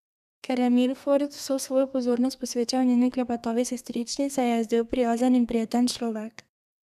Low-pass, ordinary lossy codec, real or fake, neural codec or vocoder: 14.4 kHz; none; fake; codec, 32 kHz, 1.9 kbps, SNAC